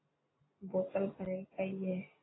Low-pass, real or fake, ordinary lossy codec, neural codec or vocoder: 7.2 kHz; real; AAC, 16 kbps; none